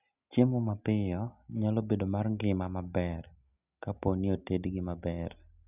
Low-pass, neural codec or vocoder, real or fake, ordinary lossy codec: 3.6 kHz; none; real; none